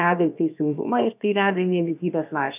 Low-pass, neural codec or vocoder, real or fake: 3.6 kHz; codec, 16 kHz, about 1 kbps, DyCAST, with the encoder's durations; fake